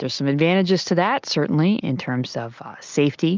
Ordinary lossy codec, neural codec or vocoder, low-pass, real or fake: Opus, 24 kbps; none; 7.2 kHz; real